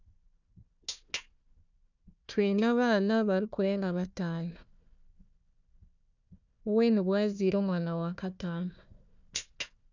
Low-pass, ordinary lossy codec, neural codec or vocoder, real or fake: 7.2 kHz; none; codec, 16 kHz, 1 kbps, FunCodec, trained on Chinese and English, 50 frames a second; fake